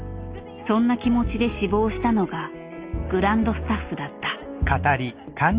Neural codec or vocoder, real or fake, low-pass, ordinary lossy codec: none; real; 3.6 kHz; Opus, 32 kbps